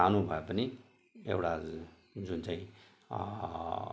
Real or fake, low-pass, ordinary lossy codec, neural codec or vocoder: real; none; none; none